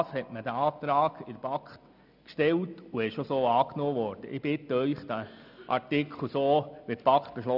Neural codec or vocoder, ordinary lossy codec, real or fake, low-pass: none; none; real; 5.4 kHz